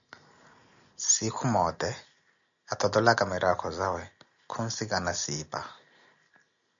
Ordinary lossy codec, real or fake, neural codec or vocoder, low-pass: MP3, 96 kbps; real; none; 7.2 kHz